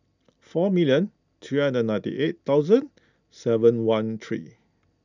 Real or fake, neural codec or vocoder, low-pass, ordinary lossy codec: real; none; 7.2 kHz; none